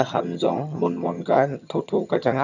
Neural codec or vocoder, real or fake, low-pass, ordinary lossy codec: vocoder, 22.05 kHz, 80 mel bands, HiFi-GAN; fake; 7.2 kHz; none